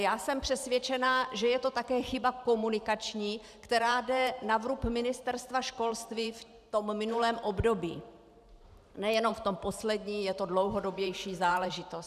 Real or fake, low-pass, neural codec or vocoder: fake; 14.4 kHz; vocoder, 44.1 kHz, 128 mel bands every 512 samples, BigVGAN v2